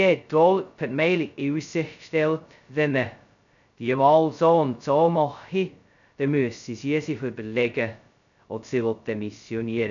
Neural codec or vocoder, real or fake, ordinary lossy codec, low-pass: codec, 16 kHz, 0.2 kbps, FocalCodec; fake; none; 7.2 kHz